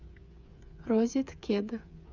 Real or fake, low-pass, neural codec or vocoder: fake; 7.2 kHz; codec, 16 kHz, 8 kbps, FreqCodec, smaller model